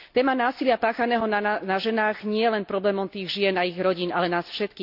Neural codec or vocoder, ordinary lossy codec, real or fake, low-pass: none; none; real; 5.4 kHz